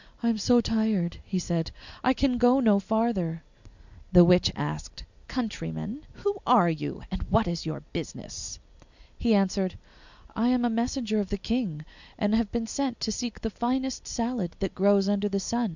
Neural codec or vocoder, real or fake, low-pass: none; real; 7.2 kHz